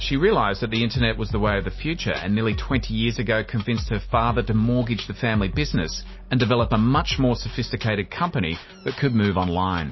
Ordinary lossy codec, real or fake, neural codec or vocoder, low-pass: MP3, 24 kbps; real; none; 7.2 kHz